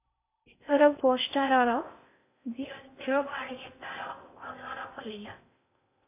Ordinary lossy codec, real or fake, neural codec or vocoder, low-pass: MP3, 32 kbps; fake; codec, 16 kHz in and 24 kHz out, 0.6 kbps, FocalCodec, streaming, 4096 codes; 3.6 kHz